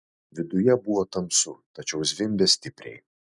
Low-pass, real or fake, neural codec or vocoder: 10.8 kHz; real; none